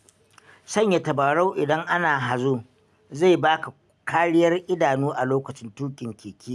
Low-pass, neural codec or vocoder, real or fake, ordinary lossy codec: none; none; real; none